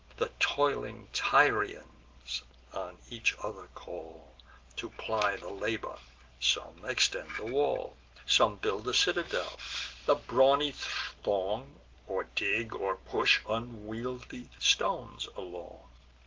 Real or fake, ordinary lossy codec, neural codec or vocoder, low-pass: real; Opus, 16 kbps; none; 7.2 kHz